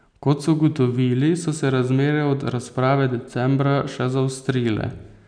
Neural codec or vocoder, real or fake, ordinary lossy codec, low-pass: none; real; none; 9.9 kHz